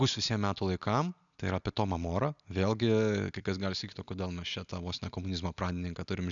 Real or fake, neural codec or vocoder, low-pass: real; none; 7.2 kHz